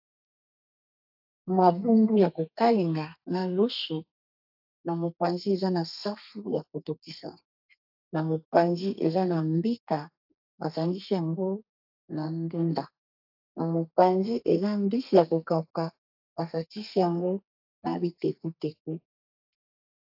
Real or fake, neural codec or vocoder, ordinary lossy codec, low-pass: fake; codec, 32 kHz, 1.9 kbps, SNAC; AAC, 48 kbps; 5.4 kHz